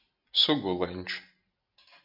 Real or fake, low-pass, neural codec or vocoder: real; 5.4 kHz; none